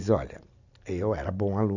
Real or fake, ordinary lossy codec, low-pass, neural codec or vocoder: real; none; 7.2 kHz; none